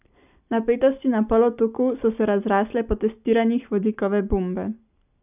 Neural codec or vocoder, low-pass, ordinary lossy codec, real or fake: none; 3.6 kHz; none; real